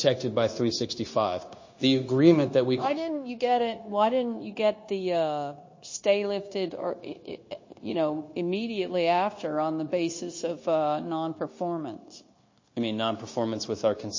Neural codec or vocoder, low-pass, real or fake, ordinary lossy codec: codec, 16 kHz, 0.9 kbps, LongCat-Audio-Codec; 7.2 kHz; fake; MP3, 32 kbps